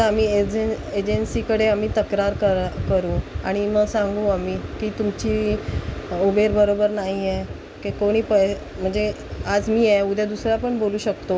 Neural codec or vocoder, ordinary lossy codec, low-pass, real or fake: none; none; none; real